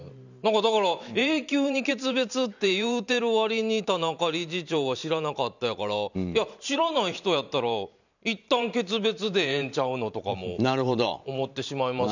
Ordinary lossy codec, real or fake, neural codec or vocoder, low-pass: none; fake; vocoder, 44.1 kHz, 128 mel bands every 512 samples, BigVGAN v2; 7.2 kHz